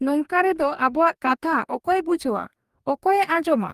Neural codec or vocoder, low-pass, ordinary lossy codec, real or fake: codec, 44.1 kHz, 2.6 kbps, DAC; 14.4 kHz; Opus, 32 kbps; fake